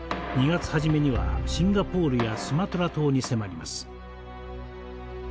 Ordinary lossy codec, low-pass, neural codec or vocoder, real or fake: none; none; none; real